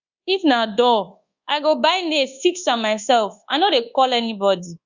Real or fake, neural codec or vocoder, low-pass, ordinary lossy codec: fake; codec, 24 kHz, 1.2 kbps, DualCodec; 7.2 kHz; Opus, 64 kbps